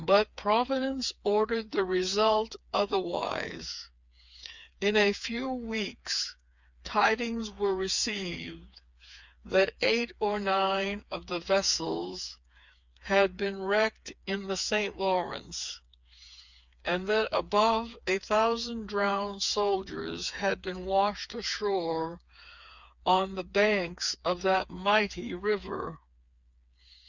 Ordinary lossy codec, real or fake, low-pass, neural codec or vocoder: Opus, 64 kbps; fake; 7.2 kHz; codec, 16 kHz, 4 kbps, FreqCodec, smaller model